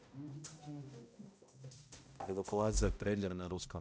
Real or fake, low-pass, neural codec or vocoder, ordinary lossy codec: fake; none; codec, 16 kHz, 0.5 kbps, X-Codec, HuBERT features, trained on balanced general audio; none